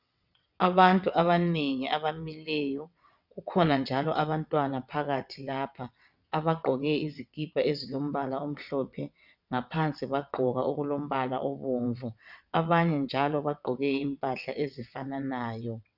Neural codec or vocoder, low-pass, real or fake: vocoder, 22.05 kHz, 80 mel bands, WaveNeXt; 5.4 kHz; fake